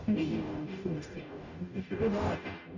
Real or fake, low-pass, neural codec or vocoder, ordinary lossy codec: fake; 7.2 kHz; codec, 44.1 kHz, 0.9 kbps, DAC; none